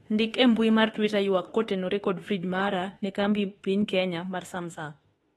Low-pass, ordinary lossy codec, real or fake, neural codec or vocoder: 19.8 kHz; AAC, 32 kbps; fake; autoencoder, 48 kHz, 32 numbers a frame, DAC-VAE, trained on Japanese speech